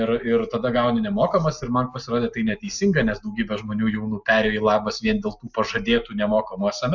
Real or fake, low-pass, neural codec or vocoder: real; 7.2 kHz; none